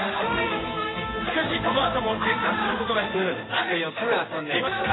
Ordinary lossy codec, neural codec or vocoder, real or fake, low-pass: AAC, 16 kbps; codec, 16 kHz in and 24 kHz out, 1 kbps, XY-Tokenizer; fake; 7.2 kHz